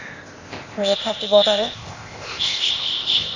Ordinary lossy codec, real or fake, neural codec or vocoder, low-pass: Opus, 64 kbps; fake; codec, 16 kHz, 0.8 kbps, ZipCodec; 7.2 kHz